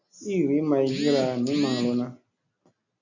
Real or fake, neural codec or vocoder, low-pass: real; none; 7.2 kHz